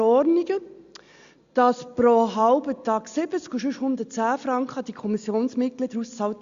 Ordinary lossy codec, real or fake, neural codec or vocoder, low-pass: Opus, 64 kbps; real; none; 7.2 kHz